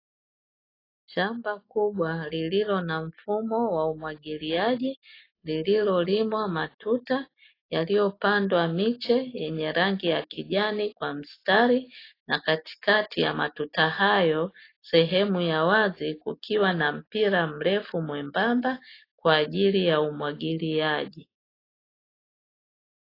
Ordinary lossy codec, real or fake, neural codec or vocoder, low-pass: AAC, 24 kbps; real; none; 5.4 kHz